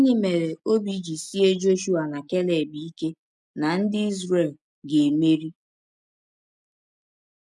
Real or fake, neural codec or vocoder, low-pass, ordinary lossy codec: real; none; none; none